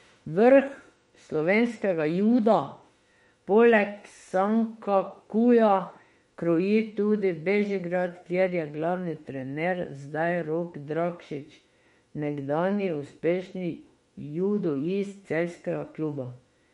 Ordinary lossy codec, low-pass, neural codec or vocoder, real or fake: MP3, 48 kbps; 19.8 kHz; autoencoder, 48 kHz, 32 numbers a frame, DAC-VAE, trained on Japanese speech; fake